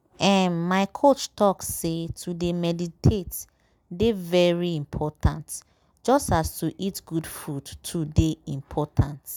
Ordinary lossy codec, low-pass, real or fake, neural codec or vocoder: none; none; real; none